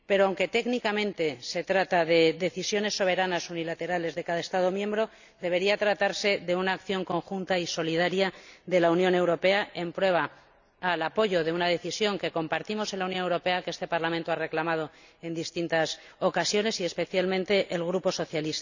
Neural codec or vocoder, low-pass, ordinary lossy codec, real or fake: none; 7.2 kHz; none; real